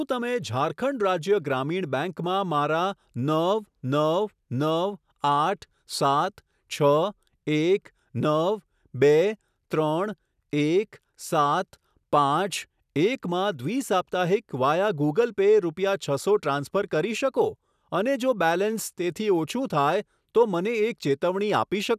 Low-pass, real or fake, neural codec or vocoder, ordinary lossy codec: 14.4 kHz; real; none; none